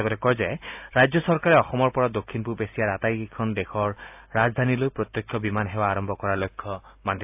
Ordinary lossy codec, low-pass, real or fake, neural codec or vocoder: AAC, 32 kbps; 3.6 kHz; real; none